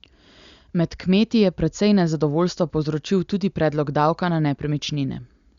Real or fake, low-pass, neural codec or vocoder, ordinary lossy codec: real; 7.2 kHz; none; Opus, 64 kbps